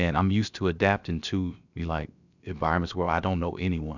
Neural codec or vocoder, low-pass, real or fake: codec, 16 kHz, about 1 kbps, DyCAST, with the encoder's durations; 7.2 kHz; fake